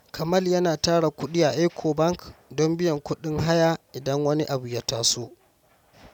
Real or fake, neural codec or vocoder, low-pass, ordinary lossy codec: real; none; 19.8 kHz; none